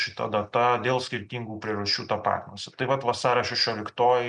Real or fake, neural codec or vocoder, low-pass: real; none; 10.8 kHz